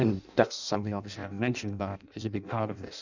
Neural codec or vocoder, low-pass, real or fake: codec, 16 kHz in and 24 kHz out, 0.6 kbps, FireRedTTS-2 codec; 7.2 kHz; fake